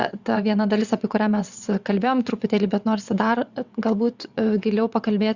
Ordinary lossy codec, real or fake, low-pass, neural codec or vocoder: Opus, 64 kbps; fake; 7.2 kHz; vocoder, 22.05 kHz, 80 mel bands, WaveNeXt